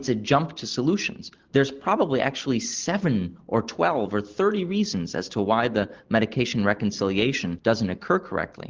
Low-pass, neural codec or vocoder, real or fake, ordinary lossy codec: 7.2 kHz; none; real; Opus, 16 kbps